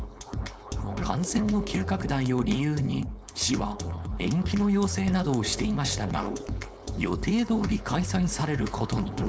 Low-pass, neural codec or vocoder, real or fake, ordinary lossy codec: none; codec, 16 kHz, 4.8 kbps, FACodec; fake; none